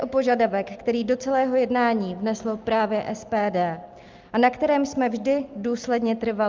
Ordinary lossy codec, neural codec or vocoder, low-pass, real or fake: Opus, 24 kbps; none; 7.2 kHz; real